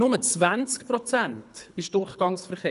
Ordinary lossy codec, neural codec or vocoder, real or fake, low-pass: none; codec, 24 kHz, 3 kbps, HILCodec; fake; 10.8 kHz